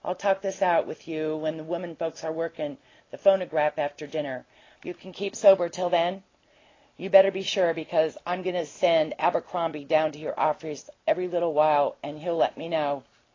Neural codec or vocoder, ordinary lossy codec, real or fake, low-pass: none; AAC, 32 kbps; real; 7.2 kHz